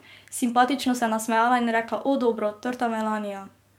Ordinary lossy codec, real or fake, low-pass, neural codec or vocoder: MP3, 96 kbps; fake; 19.8 kHz; codec, 44.1 kHz, 7.8 kbps, DAC